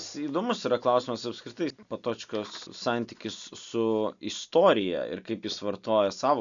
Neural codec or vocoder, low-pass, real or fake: none; 7.2 kHz; real